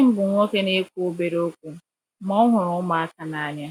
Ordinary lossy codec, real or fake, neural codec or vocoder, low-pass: none; real; none; 19.8 kHz